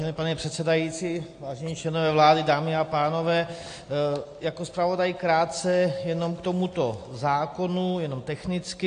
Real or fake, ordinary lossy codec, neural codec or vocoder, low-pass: real; AAC, 48 kbps; none; 9.9 kHz